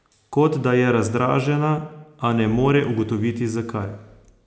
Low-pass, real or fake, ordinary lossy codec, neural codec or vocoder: none; real; none; none